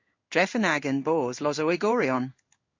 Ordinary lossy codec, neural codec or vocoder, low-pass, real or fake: MP3, 48 kbps; codec, 16 kHz in and 24 kHz out, 1 kbps, XY-Tokenizer; 7.2 kHz; fake